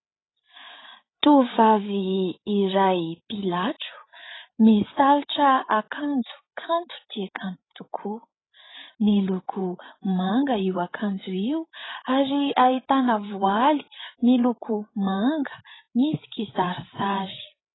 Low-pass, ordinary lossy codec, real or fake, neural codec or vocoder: 7.2 kHz; AAC, 16 kbps; fake; codec, 16 kHz, 8 kbps, FreqCodec, larger model